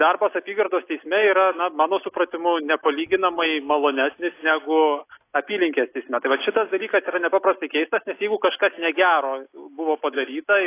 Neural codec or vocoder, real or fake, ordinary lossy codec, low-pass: none; real; AAC, 24 kbps; 3.6 kHz